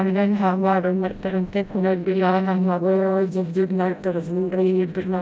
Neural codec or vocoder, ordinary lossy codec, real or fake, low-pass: codec, 16 kHz, 0.5 kbps, FreqCodec, smaller model; none; fake; none